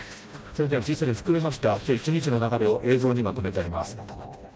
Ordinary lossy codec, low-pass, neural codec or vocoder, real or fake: none; none; codec, 16 kHz, 1 kbps, FreqCodec, smaller model; fake